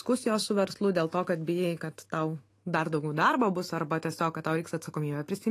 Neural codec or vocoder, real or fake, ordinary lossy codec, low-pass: autoencoder, 48 kHz, 128 numbers a frame, DAC-VAE, trained on Japanese speech; fake; AAC, 48 kbps; 14.4 kHz